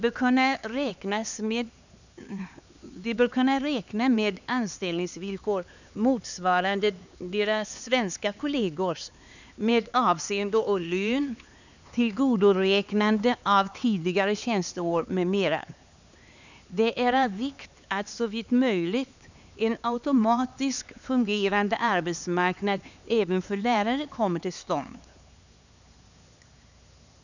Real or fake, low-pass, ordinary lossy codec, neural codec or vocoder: fake; 7.2 kHz; none; codec, 16 kHz, 4 kbps, X-Codec, HuBERT features, trained on LibriSpeech